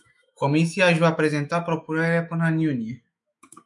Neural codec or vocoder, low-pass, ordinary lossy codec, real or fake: autoencoder, 48 kHz, 128 numbers a frame, DAC-VAE, trained on Japanese speech; 10.8 kHz; MP3, 64 kbps; fake